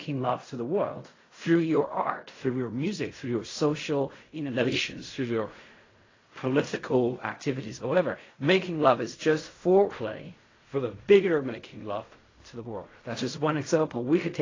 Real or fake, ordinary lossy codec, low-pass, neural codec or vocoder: fake; AAC, 32 kbps; 7.2 kHz; codec, 16 kHz in and 24 kHz out, 0.4 kbps, LongCat-Audio-Codec, fine tuned four codebook decoder